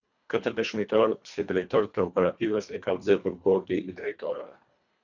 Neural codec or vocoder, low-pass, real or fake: codec, 24 kHz, 1.5 kbps, HILCodec; 7.2 kHz; fake